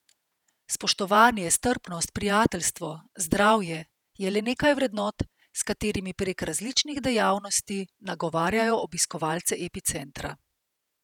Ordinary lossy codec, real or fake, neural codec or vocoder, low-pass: none; fake; vocoder, 48 kHz, 128 mel bands, Vocos; 19.8 kHz